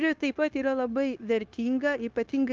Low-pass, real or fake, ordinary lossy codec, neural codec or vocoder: 7.2 kHz; fake; Opus, 32 kbps; codec, 16 kHz, 0.9 kbps, LongCat-Audio-Codec